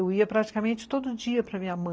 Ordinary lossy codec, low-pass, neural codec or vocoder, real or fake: none; none; none; real